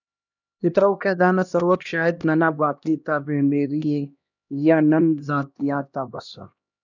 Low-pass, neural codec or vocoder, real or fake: 7.2 kHz; codec, 16 kHz, 1 kbps, X-Codec, HuBERT features, trained on LibriSpeech; fake